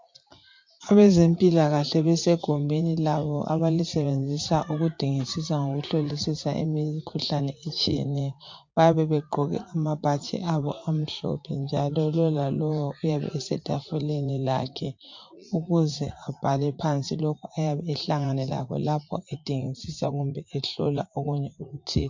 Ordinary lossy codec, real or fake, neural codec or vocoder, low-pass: MP3, 48 kbps; fake; vocoder, 44.1 kHz, 80 mel bands, Vocos; 7.2 kHz